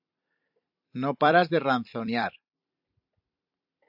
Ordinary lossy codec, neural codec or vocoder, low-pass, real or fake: AAC, 48 kbps; vocoder, 44.1 kHz, 128 mel bands every 256 samples, BigVGAN v2; 5.4 kHz; fake